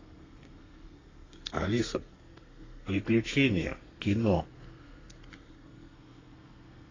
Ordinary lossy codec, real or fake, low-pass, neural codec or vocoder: AAC, 32 kbps; fake; 7.2 kHz; codec, 32 kHz, 1.9 kbps, SNAC